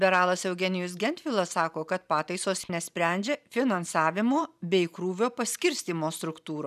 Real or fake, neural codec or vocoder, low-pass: fake; vocoder, 44.1 kHz, 128 mel bands every 512 samples, BigVGAN v2; 14.4 kHz